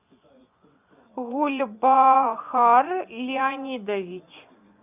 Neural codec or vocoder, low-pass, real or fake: vocoder, 24 kHz, 100 mel bands, Vocos; 3.6 kHz; fake